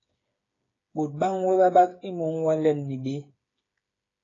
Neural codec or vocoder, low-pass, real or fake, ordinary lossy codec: codec, 16 kHz, 8 kbps, FreqCodec, smaller model; 7.2 kHz; fake; AAC, 32 kbps